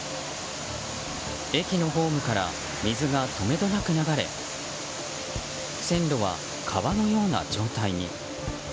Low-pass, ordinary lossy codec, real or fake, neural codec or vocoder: none; none; real; none